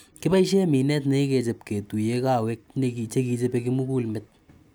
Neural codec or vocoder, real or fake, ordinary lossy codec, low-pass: none; real; none; none